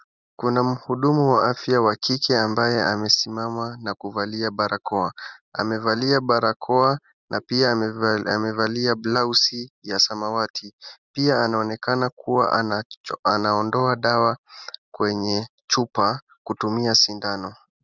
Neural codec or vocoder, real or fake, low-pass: none; real; 7.2 kHz